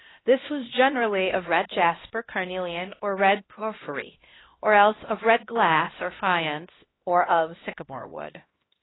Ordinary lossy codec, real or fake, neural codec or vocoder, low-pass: AAC, 16 kbps; fake; codec, 16 kHz, 1 kbps, X-Codec, HuBERT features, trained on LibriSpeech; 7.2 kHz